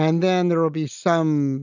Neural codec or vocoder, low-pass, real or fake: none; 7.2 kHz; real